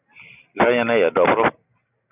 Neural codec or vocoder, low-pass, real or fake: none; 3.6 kHz; real